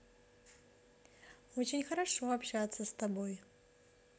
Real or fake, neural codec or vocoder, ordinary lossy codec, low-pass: real; none; none; none